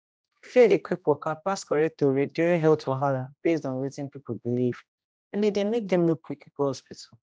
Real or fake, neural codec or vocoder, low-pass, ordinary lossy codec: fake; codec, 16 kHz, 1 kbps, X-Codec, HuBERT features, trained on general audio; none; none